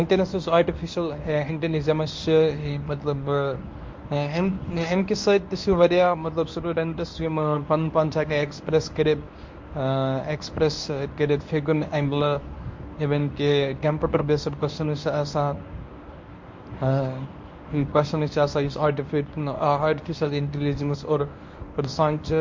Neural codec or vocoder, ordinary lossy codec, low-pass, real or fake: codec, 24 kHz, 0.9 kbps, WavTokenizer, medium speech release version 1; MP3, 48 kbps; 7.2 kHz; fake